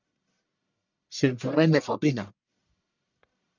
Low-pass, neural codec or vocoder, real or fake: 7.2 kHz; codec, 44.1 kHz, 1.7 kbps, Pupu-Codec; fake